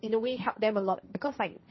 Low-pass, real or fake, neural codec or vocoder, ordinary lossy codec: 7.2 kHz; fake; codec, 16 kHz, 1.1 kbps, Voila-Tokenizer; MP3, 24 kbps